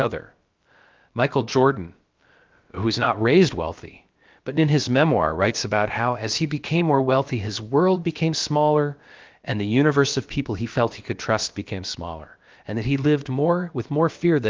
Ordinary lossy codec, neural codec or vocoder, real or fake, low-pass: Opus, 32 kbps; codec, 16 kHz, about 1 kbps, DyCAST, with the encoder's durations; fake; 7.2 kHz